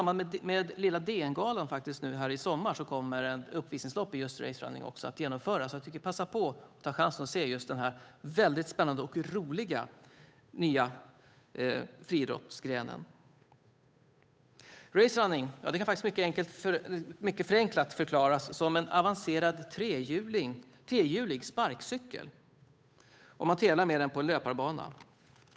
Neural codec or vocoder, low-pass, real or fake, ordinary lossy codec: codec, 16 kHz, 8 kbps, FunCodec, trained on Chinese and English, 25 frames a second; none; fake; none